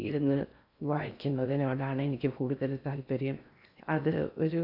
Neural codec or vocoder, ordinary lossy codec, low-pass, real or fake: codec, 16 kHz in and 24 kHz out, 0.6 kbps, FocalCodec, streaming, 4096 codes; none; 5.4 kHz; fake